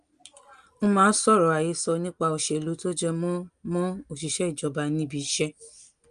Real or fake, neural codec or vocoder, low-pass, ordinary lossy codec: real; none; 9.9 kHz; Opus, 32 kbps